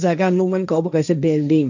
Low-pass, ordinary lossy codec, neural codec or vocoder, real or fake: 7.2 kHz; none; codec, 16 kHz, 1.1 kbps, Voila-Tokenizer; fake